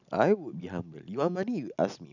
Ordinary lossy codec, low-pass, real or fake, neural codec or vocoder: none; 7.2 kHz; real; none